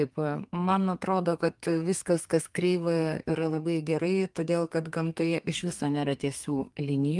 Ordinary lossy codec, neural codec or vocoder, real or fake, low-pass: Opus, 32 kbps; codec, 32 kHz, 1.9 kbps, SNAC; fake; 10.8 kHz